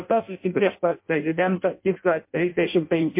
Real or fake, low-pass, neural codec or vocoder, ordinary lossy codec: fake; 3.6 kHz; codec, 16 kHz in and 24 kHz out, 0.6 kbps, FireRedTTS-2 codec; MP3, 32 kbps